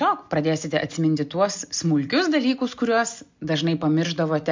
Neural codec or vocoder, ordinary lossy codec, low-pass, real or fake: none; MP3, 64 kbps; 7.2 kHz; real